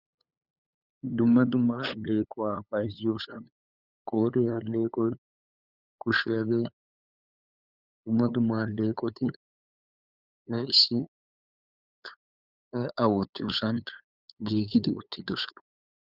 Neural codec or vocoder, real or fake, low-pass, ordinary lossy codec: codec, 16 kHz, 8 kbps, FunCodec, trained on LibriTTS, 25 frames a second; fake; 5.4 kHz; Opus, 64 kbps